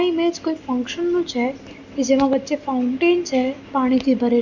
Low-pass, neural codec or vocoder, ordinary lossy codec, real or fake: 7.2 kHz; none; none; real